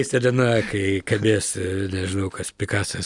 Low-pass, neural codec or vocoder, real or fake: 10.8 kHz; none; real